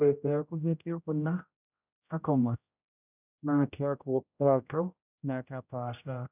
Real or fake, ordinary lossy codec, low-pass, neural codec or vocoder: fake; none; 3.6 kHz; codec, 16 kHz, 0.5 kbps, X-Codec, HuBERT features, trained on general audio